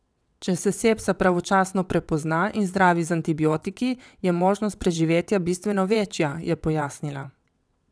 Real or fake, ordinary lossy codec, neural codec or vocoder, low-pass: fake; none; vocoder, 22.05 kHz, 80 mel bands, WaveNeXt; none